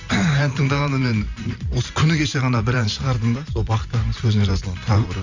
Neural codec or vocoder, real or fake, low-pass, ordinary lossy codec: vocoder, 44.1 kHz, 128 mel bands every 512 samples, BigVGAN v2; fake; 7.2 kHz; Opus, 64 kbps